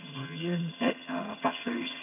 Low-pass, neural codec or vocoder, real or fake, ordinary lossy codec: 3.6 kHz; vocoder, 22.05 kHz, 80 mel bands, HiFi-GAN; fake; none